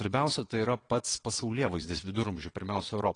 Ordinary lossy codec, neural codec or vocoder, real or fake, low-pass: AAC, 32 kbps; vocoder, 22.05 kHz, 80 mel bands, WaveNeXt; fake; 9.9 kHz